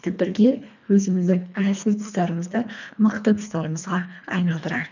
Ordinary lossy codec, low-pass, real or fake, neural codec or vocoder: none; 7.2 kHz; fake; codec, 24 kHz, 1.5 kbps, HILCodec